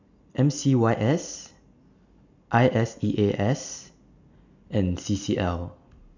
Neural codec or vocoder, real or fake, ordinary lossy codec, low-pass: none; real; none; 7.2 kHz